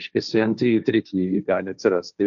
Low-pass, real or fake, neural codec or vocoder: 7.2 kHz; fake; codec, 16 kHz, 1.1 kbps, Voila-Tokenizer